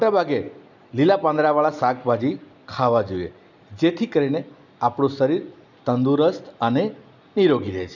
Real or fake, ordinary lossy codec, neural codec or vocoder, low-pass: real; none; none; 7.2 kHz